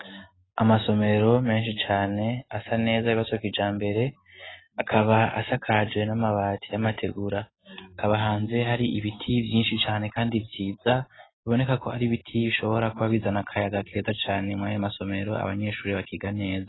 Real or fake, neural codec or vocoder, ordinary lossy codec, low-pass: real; none; AAC, 16 kbps; 7.2 kHz